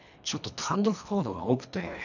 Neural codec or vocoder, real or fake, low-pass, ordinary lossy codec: codec, 24 kHz, 1.5 kbps, HILCodec; fake; 7.2 kHz; none